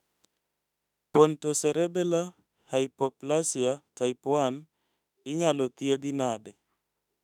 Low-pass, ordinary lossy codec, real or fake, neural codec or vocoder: 19.8 kHz; none; fake; autoencoder, 48 kHz, 32 numbers a frame, DAC-VAE, trained on Japanese speech